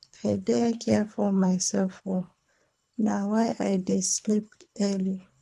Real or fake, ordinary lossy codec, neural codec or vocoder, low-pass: fake; none; codec, 24 kHz, 3 kbps, HILCodec; none